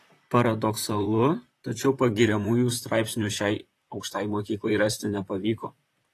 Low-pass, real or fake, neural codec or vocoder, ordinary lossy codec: 14.4 kHz; fake; vocoder, 44.1 kHz, 128 mel bands, Pupu-Vocoder; AAC, 48 kbps